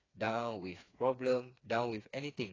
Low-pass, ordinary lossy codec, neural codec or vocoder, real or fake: 7.2 kHz; AAC, 32 kbps; codec, 16 kHz, 4 kbps, FreqCodec, smaller model; fake